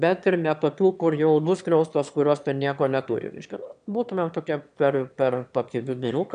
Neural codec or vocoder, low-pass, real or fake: autoencoder, 22.05 kHz, a latent of 192 numbers a frame, VITS, trained on one speaker; 9.9 kHz; fake